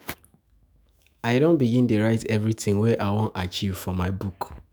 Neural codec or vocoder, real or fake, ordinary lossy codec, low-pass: autoencoder, 48 kHz, 128 numbers a frame, DAC-VAE, trained on Japanese speech; fake; none; none